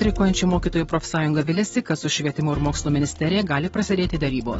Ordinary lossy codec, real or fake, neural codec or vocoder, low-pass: AAC, 24 kbps; real; none; 9.9 kHz